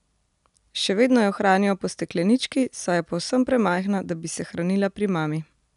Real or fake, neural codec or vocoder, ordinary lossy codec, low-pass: real; none; none; 10.8 kHz